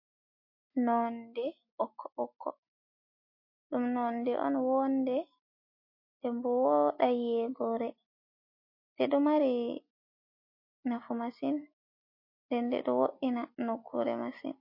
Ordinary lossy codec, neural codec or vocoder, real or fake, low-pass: MP3, 32 kbps; none; real; 5.4 kHz